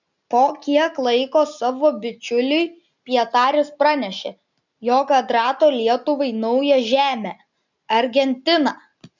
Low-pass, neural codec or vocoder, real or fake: 7.2 kHz; none; real